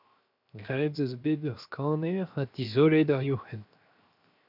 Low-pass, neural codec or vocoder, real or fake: 5.4 kHz; codec, 16 kHz, 0.7 kbps, FocalCodec; fake